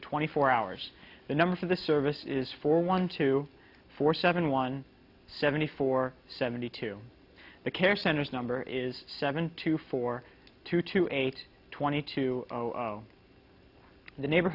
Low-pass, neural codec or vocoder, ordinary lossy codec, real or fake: 5.4 kHz; none; AAC, 48 kbps; real